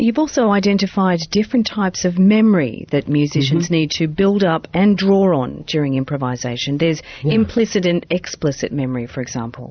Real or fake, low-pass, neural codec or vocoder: fake; 7.2 kHz; vocoder, 44.1 kHz, 128 mel bands every 512 samples, BigVGAN v2